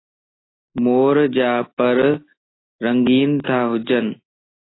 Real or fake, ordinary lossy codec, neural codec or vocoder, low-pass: real; AAC, 16 kbps; none; 7.2 kHz